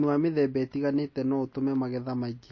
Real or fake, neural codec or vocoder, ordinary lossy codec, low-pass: real; none; MP3, 32 kbps; 7.2 kHz